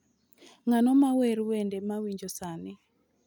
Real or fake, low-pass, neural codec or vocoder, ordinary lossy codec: real; 19.8 kHz; none; none